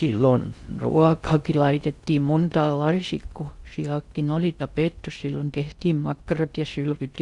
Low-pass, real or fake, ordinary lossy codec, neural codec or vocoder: 10.8 kHz; fake; Opus, 64 kbps; codec, 16 kHz in and 24 kHz out, 0.6 kbps, FocalCodec, streaming, 4096 codes